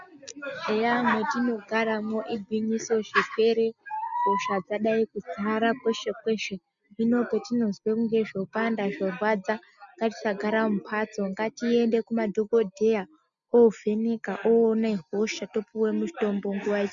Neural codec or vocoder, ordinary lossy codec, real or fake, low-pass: none; MP3, 96 kbps; real; 7.2 kHz